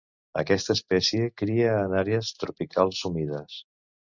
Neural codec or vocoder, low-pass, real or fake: none; 7.2 kHz; real